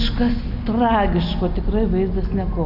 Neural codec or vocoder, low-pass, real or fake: none; 5.4 kHz; real